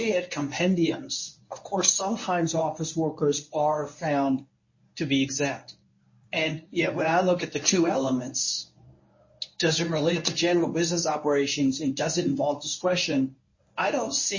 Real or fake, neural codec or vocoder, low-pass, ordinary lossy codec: fake; codec, 24 kHz, 0.9 kbps, WavTokenizer, medium speech release version 1; 7.2 kHz; MP3, 32 kbps